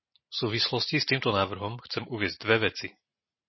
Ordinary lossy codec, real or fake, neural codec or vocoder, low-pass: MP3, 24 kbps; real; none; 7.2 kHz